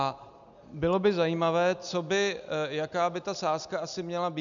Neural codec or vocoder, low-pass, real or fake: none; 7.2 kHz; real